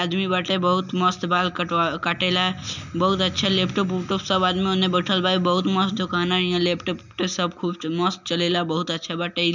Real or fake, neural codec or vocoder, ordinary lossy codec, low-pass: real; none; none; 7.2 kHz